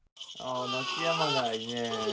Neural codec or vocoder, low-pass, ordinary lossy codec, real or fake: none; none; none; real